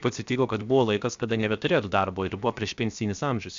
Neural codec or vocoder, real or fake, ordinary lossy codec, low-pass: codec, 16 kHz, 0.7 kbps, FocalCodec; fake; MP3, 64 kbps; 7.2 kHz